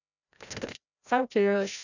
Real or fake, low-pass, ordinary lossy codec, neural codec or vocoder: fake; 7.2 kHz; none; codec, 16 kHz, 0.5 kbps, FreqCodec, larger model